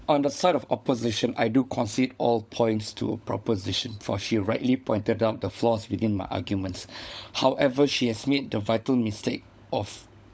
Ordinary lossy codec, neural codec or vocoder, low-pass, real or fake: none; codec, 16 kHz, 16 kbps, FunCodec, trained on LibriTTS, 50 frames a second; none; fake